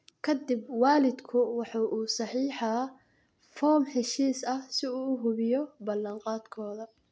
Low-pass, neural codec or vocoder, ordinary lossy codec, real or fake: none; none; none; real